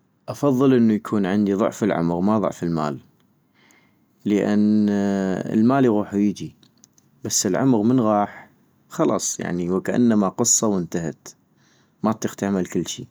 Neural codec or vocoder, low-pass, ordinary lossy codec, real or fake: none; none; none; real